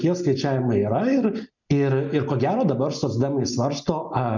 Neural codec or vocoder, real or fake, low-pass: none; real; 7.2 kHz